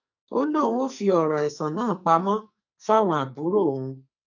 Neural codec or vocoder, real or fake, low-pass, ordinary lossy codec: codec, 44.1 kHz, 2.6 kbps, SNAC; fake; 7.2 kHz; none